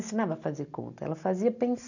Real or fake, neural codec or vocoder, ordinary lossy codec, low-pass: real; none; none; 7.2 kHz